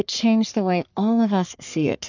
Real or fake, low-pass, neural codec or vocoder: fake; 7.2 kHz; codec, 44.1 kHz, 3.4 kbps, Pupu-Codec